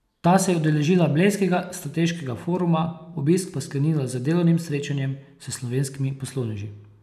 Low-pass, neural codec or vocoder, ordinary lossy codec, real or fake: 14.4 kHz; none; none; real